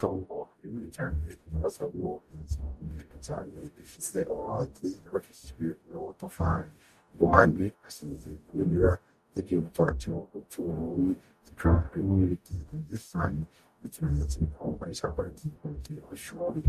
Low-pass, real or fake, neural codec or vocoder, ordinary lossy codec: 14.4 kHz; fake; codec, 44.1 kHz, 0.9 kbps, DAC; MP3, 64 kbps